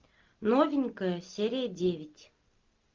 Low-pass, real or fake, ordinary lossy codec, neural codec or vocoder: 7.2 kHz; real; Opus, 24 kbps; none